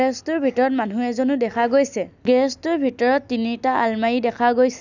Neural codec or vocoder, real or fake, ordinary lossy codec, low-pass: none; real; none; 7.2 kHz